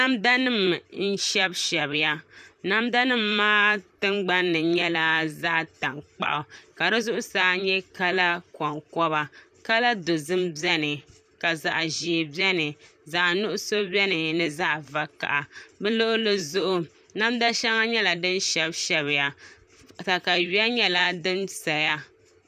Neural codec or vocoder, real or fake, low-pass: vocoder, 44.1 kHz, 128 mel bands, Pupu-Vocoder; fake; 14.4 kHz